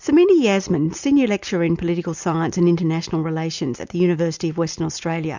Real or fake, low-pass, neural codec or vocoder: real; 7.2 kHz; none